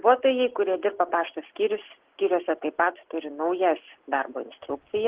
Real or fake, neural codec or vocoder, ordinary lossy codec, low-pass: real; none; Opus, 16 kbps; 3.6 kHz